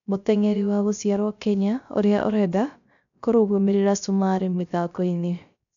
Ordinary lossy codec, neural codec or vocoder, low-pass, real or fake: none; codec, 16 kHz, 0.3 kbps, FocalCodec; 7.2 kHz; fake